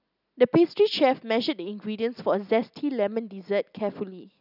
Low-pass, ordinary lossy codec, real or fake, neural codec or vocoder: 5.4 kHz; none; real; none